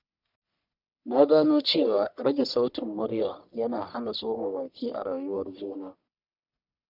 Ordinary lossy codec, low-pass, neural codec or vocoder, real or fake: none; 5.4 kHz; codec, 44.1 kHz, 1.7 kbps, Pupu-Codec; fake